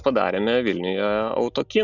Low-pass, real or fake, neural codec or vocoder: 7.2 kHz; real; none